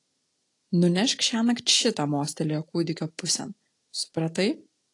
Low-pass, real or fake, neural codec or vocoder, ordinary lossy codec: 10.8 kHz; real; none; AAC, 48 kbps